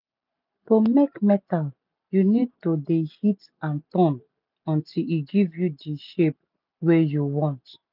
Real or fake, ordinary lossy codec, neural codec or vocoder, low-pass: real; none; none; 5.4 kHz